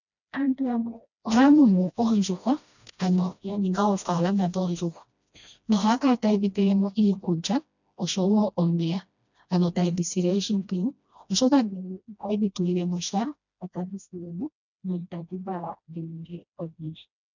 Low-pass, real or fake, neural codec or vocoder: 7.2 kHz; fake; codec, 16 kHz, 1 kbps, FreqCodec, smaller model